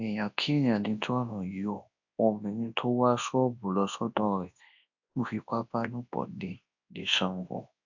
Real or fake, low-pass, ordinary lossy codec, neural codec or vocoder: fake; 7.2 kHz; AAC, 48 kbps; codec, 24 kHz, 0.9 kbps, WavTokenizer, large speech release